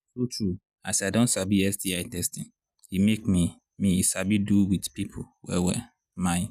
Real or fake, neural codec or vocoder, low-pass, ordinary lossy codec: real; none; 14.4 kHz; none